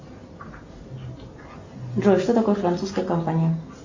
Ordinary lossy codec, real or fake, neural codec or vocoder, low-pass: MP3, 48 kbps; real; none; 7.2 kHz